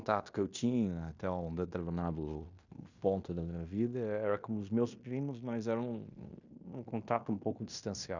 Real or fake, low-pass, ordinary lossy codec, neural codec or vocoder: fake; 7.2 kHz; none; codec, 16 kHz in and 24 kHz out, 0.9 kbps, LongCat-Audio-Codec, fine tuned four codebook decoder